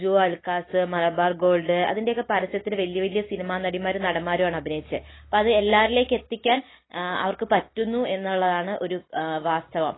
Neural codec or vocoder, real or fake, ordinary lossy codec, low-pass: none; real; AAC, 16 kbps; 7.2 kHz